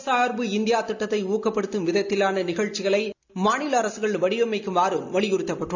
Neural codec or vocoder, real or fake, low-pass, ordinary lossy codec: none; real; 7.2 kHz; none